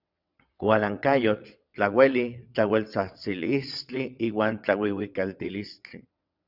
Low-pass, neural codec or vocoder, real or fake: 5.4 kHz; vocoder, 22.05 kHz, 80 mel bands, WaveNeXt; fake